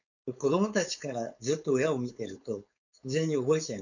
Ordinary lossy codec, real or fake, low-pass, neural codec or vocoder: none; fake; 7.2 kHz; codec, 16 kHz, 4.8 kbps, FACodec